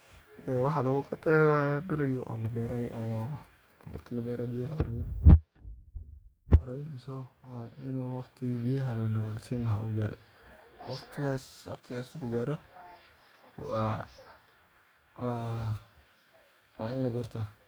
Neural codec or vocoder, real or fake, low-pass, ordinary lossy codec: codec, 44.1 kHz, 2.6 kbps, DAC; fake; none; none